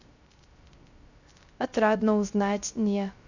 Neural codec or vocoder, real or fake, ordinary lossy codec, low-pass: codec, 16 kHz, 0.3 kbps, FocalCodec; fake; none; 7.2 kHz